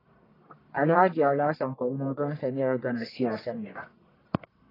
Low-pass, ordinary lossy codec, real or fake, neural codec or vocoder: 5.4 kHz; AAC, 24 kbps; fake; codec, 44.1 kHz, 1.7 kbps, Pupu-Codec